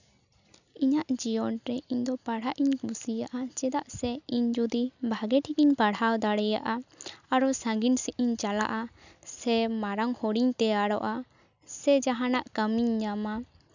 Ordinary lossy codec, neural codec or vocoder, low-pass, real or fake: none; none; 7.2 kHz; real